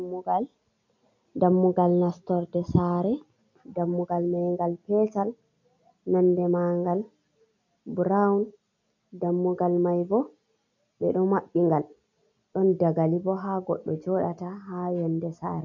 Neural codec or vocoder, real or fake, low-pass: none; real; 7.2 kHz